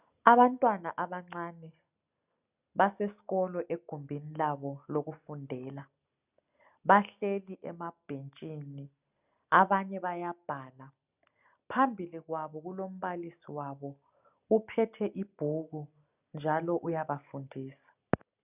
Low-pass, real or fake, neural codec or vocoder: 3.6 kHz; real; none